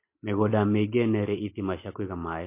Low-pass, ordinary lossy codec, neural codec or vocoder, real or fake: 3.6 kHz; MP3, 24 kbps; none; real